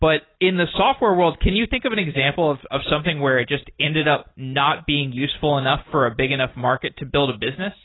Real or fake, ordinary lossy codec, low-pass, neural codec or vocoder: real; AAC, 16 kbps; 7.2 kHz; none